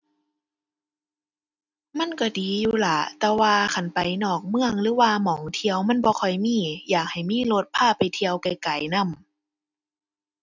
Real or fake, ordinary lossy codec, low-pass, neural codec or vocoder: real; none; 7.2 kHz; none